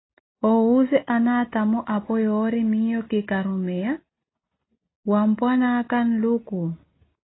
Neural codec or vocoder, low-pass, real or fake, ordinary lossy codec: none; 7.2 kHz; real; AAC, 16 kbps